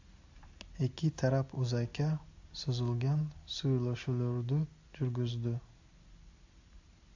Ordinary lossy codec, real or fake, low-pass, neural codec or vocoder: AAC, 48 kbps; real; 7.2 kHz; none